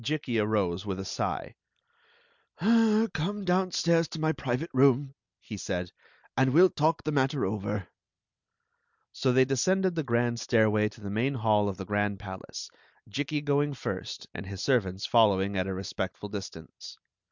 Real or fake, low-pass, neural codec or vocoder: real; 7.2 kHz; none